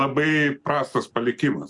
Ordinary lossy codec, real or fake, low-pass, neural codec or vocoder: MP3, 64 kbps; real; 10.8 kHz; none